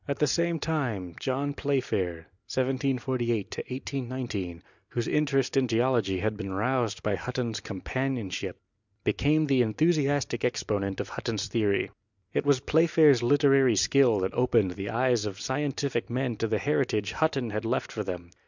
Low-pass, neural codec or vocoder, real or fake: 7.2 kHz; none; real